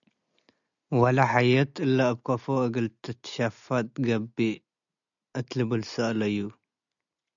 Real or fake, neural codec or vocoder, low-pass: real; none; 7.2 kHz